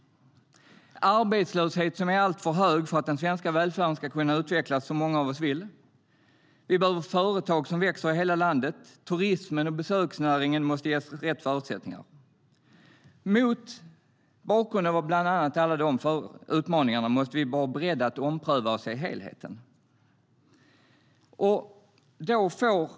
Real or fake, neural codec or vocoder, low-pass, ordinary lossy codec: real; none; none; none